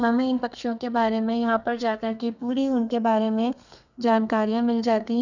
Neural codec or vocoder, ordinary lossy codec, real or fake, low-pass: codec, 32 kHz, 1.9 kbps, SNAC; none; fake; 7.2 kHz